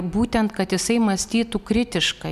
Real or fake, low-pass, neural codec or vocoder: real; 14.4 kHz; none